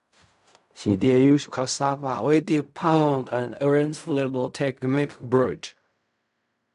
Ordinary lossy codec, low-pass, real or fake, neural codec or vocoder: none; 10.8 kHz; fake; codec, 16 kHz in and 24 kHz out, 0.4 kbps, LongCat-Audio-Codec, fine tuned four codebook decoder